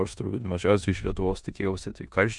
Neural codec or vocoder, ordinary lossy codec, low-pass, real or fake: codec, 16 kHz in and 24 kHz out, 0.9 kbps, LongCat-Audio-Codec, four codebook decoder; MP3, 96 kbps; 10.8 kHz; fake